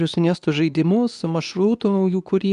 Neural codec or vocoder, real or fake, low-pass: codec, 24 kHz, 0.9 kbps, WavTokenizer, medium speech release version 2; fake; 10.8 kHz